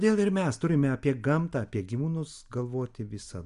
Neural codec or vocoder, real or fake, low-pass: none; real; 10.8 kHz